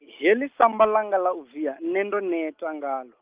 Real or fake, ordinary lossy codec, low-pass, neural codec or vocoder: real; Opus, 24 kbps; 3.6 kHz; none